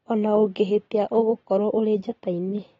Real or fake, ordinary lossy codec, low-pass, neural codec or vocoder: real; AAC, 24 kbps; 7.2 kHz; none